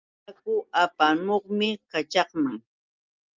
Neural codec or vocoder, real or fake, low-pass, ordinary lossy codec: none; real; 7.2 kHz; Opus, 32 kbps